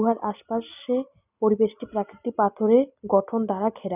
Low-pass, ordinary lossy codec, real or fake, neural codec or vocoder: 3.6 kHz; none; real; none